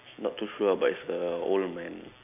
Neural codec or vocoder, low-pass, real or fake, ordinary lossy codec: none; 3.6 kHz; real; none